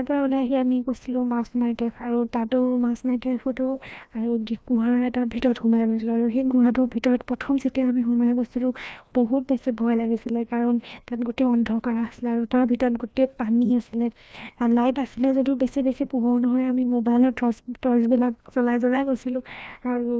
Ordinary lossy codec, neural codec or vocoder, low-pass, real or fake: none; codec, 16 kHz, 1 kbps, FreqCodec, larger model; none; fake